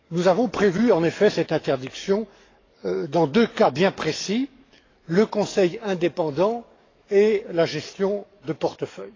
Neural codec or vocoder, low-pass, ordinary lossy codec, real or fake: codec, 44.1 kHz, 7.8 kbps, DAC; 7.2 kHz; AAC, 32 kbps; fake